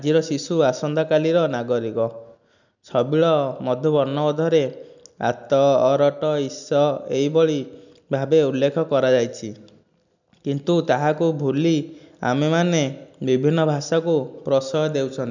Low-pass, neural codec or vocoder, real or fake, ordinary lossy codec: 7.2 kHz; none; real; none